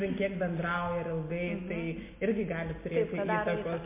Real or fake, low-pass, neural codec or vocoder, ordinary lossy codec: real; 3.6 kHz; none; MP3, 24 kbps